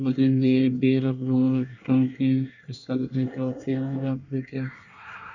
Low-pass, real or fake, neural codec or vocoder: 7.2 kHz; fake; codec, 24 kHz, 1 kbps, SNAC